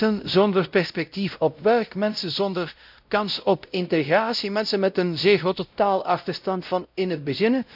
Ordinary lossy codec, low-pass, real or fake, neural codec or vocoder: none; 5.4 kHz; fake; codec, 16 kHz, 0.5 kbps, X-Codec, WavLM features, trained on Multilingual LibriSpeech